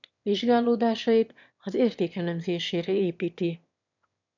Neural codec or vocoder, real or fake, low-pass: autoencoder, 22.05 kHz, a latent of 192 numbers a frame, VITS, trained on one speaker; fake; 7.2 kHz